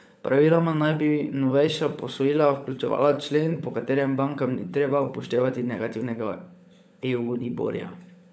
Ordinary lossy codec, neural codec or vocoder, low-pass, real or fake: none; codec, 16 kHz, 16 kbps, FunCodec, trained on LibriTTS, 50 frames a second; none; fake